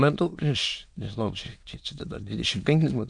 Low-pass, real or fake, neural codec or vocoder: 9.9 kHz; fake; autoencoder, 22.05 kHz, a latent of 192 numbers a frame, VITS, trained on many speakers